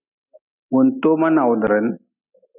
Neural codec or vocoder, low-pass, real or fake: none; 3.6 kHz; real